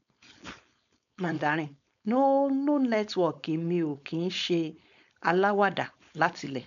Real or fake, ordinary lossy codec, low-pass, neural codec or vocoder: fake; none; 7.2 kHz; codec, 16 kHz, 4.8 kbps, FACodec